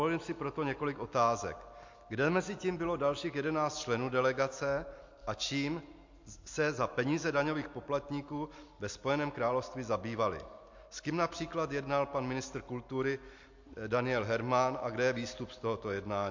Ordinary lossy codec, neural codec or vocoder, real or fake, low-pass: MP3, 48 kbps; none; real; 7.2 kHz